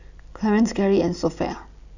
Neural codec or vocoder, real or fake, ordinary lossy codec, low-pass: none; real; none; 7.2 kHz